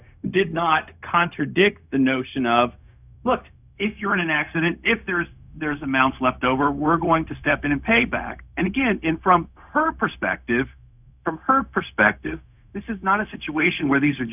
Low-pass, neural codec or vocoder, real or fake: 3.6 kHz; codec, 16 kHz, 0.4 kbps, LongCat-Audio-Codec; fake